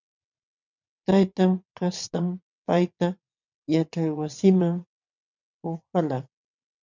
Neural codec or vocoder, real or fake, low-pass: vocoder, 22.05 kHz, 80 mel bands, WaveNeXt; fake; 7.2 kHz